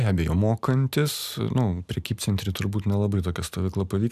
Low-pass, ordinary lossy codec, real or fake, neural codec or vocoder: 14.4 kHz; AAC, 96 kbps; real; none